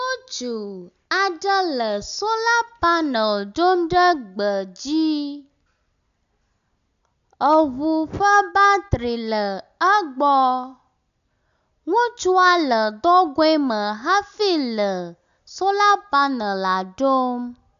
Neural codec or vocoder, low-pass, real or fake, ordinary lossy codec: none; 7.2 kHz; real; AAC, 96 kbps